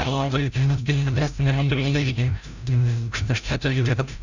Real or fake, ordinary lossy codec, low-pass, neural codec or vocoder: fake; none; 7.2 kHz; codec, 16 kHz, 0.5 kbps, FreqCodec, larger model